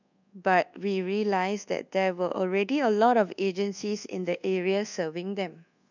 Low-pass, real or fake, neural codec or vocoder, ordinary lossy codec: 7.2 kHz; fake; codec, 24 kHz, 1.2 kbps, DualCodec; none